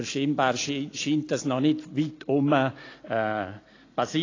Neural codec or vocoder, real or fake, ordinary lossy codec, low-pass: none; real; AAC, 32 kbps; 7.2 kHz